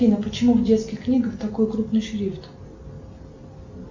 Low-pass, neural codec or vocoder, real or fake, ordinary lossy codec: 7.2 kHz; none; real; MP3, 64 kbps